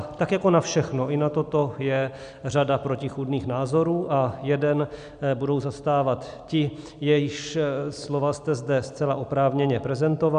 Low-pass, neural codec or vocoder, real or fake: 9.9 kHz; none; real